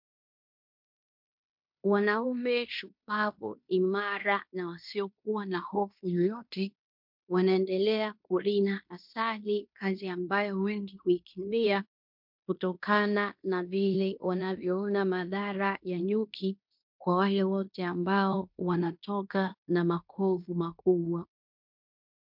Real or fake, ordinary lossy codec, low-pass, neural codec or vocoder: fake; MP3, 48 kbps; 5.4 kHz; codec, 16 kHz in and 24 kHz out, 0.9 kbps, LongCat-Audio-Codec, fine tuned four codebook decoder